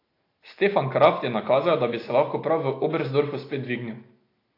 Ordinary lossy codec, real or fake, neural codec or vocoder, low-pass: AAC, 32 kbps; real; none; 5.4 kHz